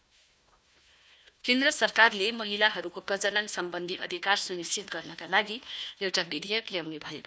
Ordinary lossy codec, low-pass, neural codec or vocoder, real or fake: none; none; codec, 16 kHz, 1 kbps, FunCodec, trained on Chinese and English, 50 frames a second; fake